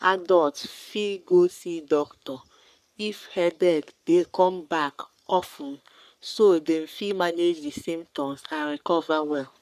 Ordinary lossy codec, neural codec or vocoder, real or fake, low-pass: none; codec, 44.1 kHz, 3.4 kbps, Pupu-Codec; fake; 14.4 kHz